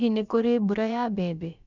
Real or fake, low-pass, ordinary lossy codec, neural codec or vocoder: fake; 7.2 kHz; none; codec, 16 kHz, about 1 kbps, DyCAST, with the encoder's durations